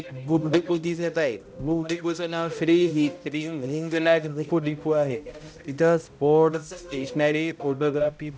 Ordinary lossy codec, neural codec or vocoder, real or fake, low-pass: none; codec, 16 kHz, 0.5 kbps, X-Codec, HuBERT features, trained on balanced general audio; fake; none